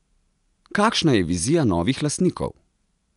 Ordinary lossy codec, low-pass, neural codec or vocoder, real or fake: none; 10.8 kHz; none; real